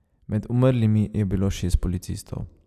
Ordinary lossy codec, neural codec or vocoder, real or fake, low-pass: none; none; real; 14.4 kHz